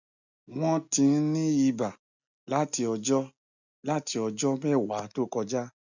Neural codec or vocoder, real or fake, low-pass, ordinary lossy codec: none; real; 7.2 kHz; none